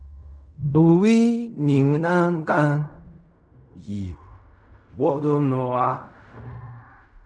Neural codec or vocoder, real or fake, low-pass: codec, 16 kHz in and 24 kHz out, 0.4 kbps, LongCat-Audio-Codec, fine tuned four codebook decoder; fake; 9.9 kHz